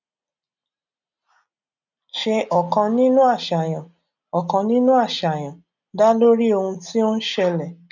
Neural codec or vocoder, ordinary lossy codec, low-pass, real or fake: none; none; 7.2 kHz; real